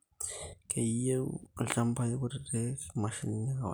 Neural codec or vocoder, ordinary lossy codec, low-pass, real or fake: none; none; none; real